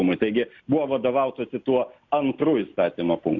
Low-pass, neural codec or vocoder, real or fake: 7.2 kHz; none; real